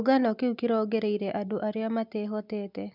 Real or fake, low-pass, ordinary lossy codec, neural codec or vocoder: real; 5.4 kHz; none; none